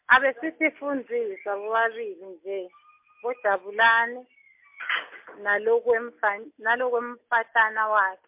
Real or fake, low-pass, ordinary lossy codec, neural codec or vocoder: real; 3.6 kHz; MP3, 32 kbps; none